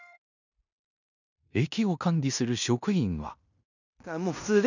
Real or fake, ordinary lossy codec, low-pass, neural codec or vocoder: fake; none; 7.2 kHz; codec, 16 kHz in and 24 kHz out, 0.9 kbps, LongCat-Audio-Codec, fine tuned four codebook decoder